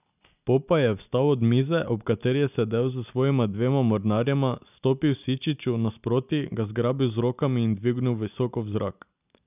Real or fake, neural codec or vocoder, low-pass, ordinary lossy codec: real; none; 3.6 kHz; none